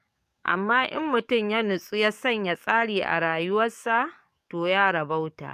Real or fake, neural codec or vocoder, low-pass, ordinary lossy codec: fake; codec, 44.1 kHz, 7.8 kbps, DAC; 14.4 kHz; MP3, 96 kbps